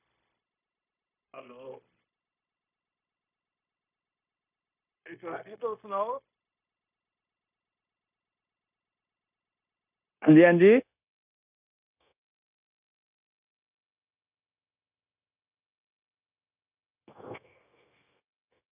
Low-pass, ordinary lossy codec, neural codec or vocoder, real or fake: 3.6 kHz; none; codec, 16 kHz, 0.9 kbps, LongCat-Audio-Codec; fake